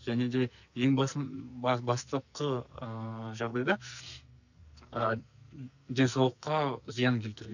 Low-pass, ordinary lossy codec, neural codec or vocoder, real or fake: 7.2 kHz; none; codec, 44.1 kHz, 2.6 kbps, SNAC; fake